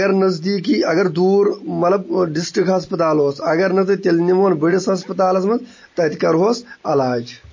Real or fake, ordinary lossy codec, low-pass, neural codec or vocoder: real; MP3, 32 kbps; 7.2 kHz; none